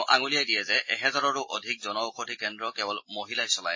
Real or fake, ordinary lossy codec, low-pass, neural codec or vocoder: real; MP3, 32 kbps; 7.2 kHz; none